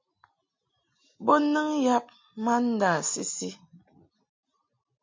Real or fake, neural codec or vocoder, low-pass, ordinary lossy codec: real; none; 7.2 kHz; MP3, 48 kbps